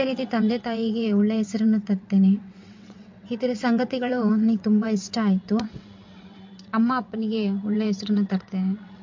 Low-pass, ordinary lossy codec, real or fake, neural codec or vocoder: 7.2 kHz; MP3, 48 kbps; fake; vocoder, 22.05 kHz, 80 mel bands, WaveNeXt